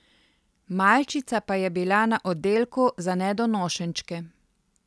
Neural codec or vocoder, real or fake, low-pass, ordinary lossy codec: none; real; none; none